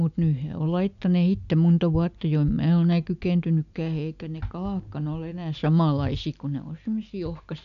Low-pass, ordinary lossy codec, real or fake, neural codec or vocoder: 7.2 kHz; none; real; none